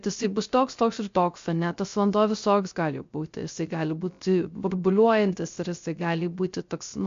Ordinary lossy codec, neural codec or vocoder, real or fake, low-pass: MP3, 48 kbps; codec, 16 kHz, 0.3 kbps, FocalCodec; fake; 7.2 kHz